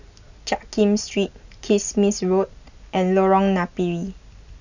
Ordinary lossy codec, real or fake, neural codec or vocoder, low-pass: none; real; none; 7.2 kHz